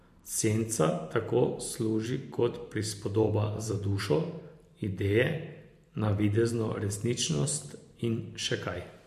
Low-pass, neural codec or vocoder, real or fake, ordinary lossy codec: 14.4 kHz; vocoder, 44.1 kHz, 128 mel bands every 512 samples, BigVGAN v2; fake; MP3, 64 kbps